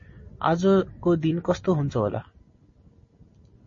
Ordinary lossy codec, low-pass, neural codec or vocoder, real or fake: MP3, 32 kbps; 7.2 kHz; none; real